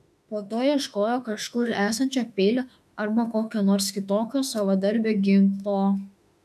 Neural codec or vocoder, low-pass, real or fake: autoencoder, 48 kHz, 32 numbers a frame, DAC-VAE, trained on Japanese speech; 14.4 kHz; fake